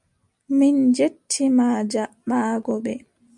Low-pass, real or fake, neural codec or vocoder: 10.8 kHz; real; none